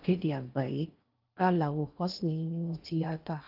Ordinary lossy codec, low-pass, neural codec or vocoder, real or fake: Opus, 24 kbps; 5.4 kHz; codec, 16 kHz in and 24 kHz out, 0.6 kbps, FocalCodec, streaming, 4096 codes; fake